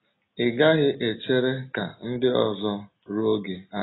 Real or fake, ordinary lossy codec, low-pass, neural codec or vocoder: real; AAC, 16 kbps; 7.2 kHz; none